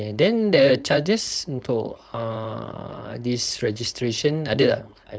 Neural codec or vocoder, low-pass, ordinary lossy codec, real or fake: codec, 16 kHz, 4.8 kbps, FACodec; none; none; fake